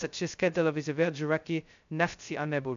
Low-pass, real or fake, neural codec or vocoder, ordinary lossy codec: 7.2 kHz; fake; codec, 16 kHz, 0.2 kbps, FocalCodec; MP3, 64 kbps